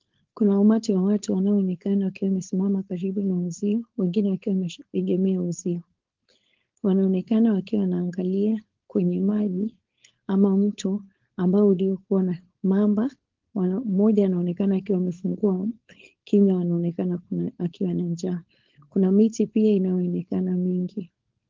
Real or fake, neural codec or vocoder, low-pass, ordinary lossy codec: fake; codec, 16 kHz, 4.8 kbps, FACodec; 7.2 kHz; Opus, 16 kbps